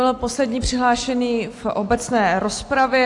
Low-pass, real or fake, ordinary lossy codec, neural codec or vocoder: 10.8 kHz; real; AAC, 48 kbps; none